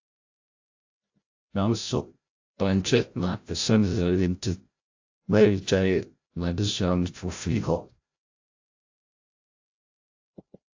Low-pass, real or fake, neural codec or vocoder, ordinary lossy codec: 7.2 kHz; fake; codec, 16 kHz, 0.5 kbps, FreqCodec, larger model; AAC, 48 kbps